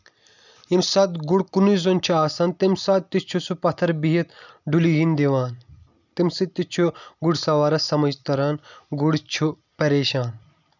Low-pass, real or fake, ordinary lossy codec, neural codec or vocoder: 7.2 kHz; real; none; none